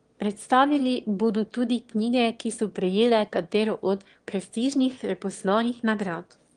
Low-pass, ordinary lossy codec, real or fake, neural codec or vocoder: 9.9 kHz; Opus, 24 kbps; fake; autoencoder, 22.05 kHz, a latent of 192 numbers a frame, VITS, trained on one speaker